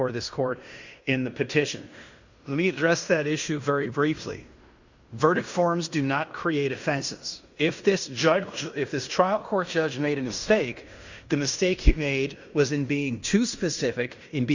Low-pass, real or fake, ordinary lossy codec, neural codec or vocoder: 7.2 kHz; fake; Opus, 64 kbps; codec, 16 kHz in and 24 kHz out, 0.9 kbps, LongCat-Audio-Codec, fine tuned four codebook decoder